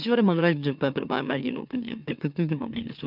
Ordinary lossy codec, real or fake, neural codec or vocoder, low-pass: none; fake; autoencoder, 44.1 kHz, a latent of 192 numbers a frame, MeloTTS; 5.4 kHz